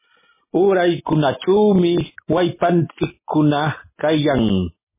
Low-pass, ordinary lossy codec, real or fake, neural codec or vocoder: 3.6 kHz; MP3, 16 kbps; real; none